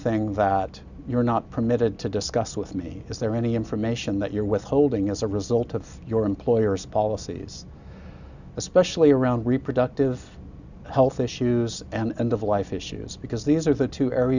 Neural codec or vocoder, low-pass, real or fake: none; 7.2 kHz; real